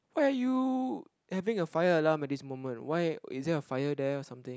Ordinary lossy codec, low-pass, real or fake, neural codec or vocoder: none; none; real; none